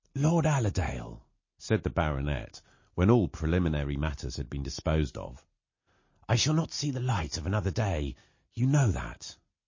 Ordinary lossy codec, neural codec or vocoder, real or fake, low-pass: MP3, 32 kbps; none; real; 7.2 kHz